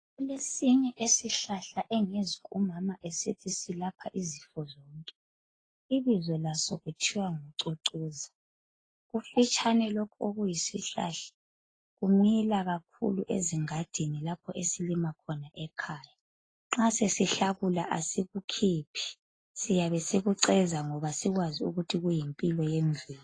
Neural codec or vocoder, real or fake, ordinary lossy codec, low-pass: none; real; AAC, 32 kbps; 9.9 kHz